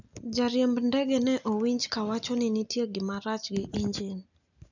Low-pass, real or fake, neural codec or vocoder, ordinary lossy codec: 7.2 kHz; real; none; none